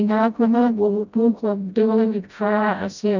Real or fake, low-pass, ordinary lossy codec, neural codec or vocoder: fake; 7.2 kHz; none; codec, 16 kHz, 0.5 kbps, FreqCodec, smaller model